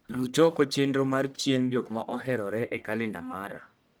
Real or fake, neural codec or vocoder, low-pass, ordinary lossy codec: fake; codec, 44.1 kHz, 1.7 kbps, Pupu-Codec; none; none